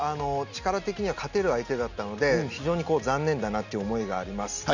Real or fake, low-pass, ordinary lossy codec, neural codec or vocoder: real; 7.2 kHz; none; none